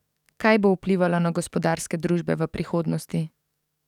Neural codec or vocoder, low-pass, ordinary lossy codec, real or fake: codec, 44.1 kHz, 7.8 kbps, DAC; 19.8 kHz; none; fake